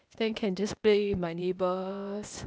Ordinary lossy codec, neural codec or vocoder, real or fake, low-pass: none; codec, 16 kHz, 0.8 kbps, ZipCodec; fake; none